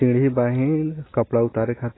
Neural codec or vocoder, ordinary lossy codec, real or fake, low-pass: none; AAC, 16 kbps; real; 7.2 kHz